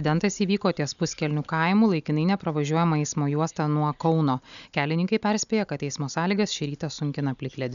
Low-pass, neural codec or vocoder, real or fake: 7.2 kHz; none; real